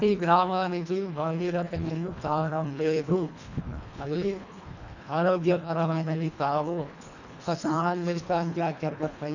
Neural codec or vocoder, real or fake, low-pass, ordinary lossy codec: codec, 24 kHz, 1.5 kbps, HILCodec; fake; 7.2 kHz; none